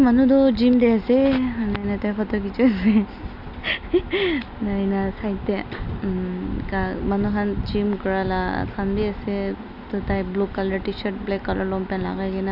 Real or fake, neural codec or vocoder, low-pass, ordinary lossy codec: real; none; 5.4 kHz; none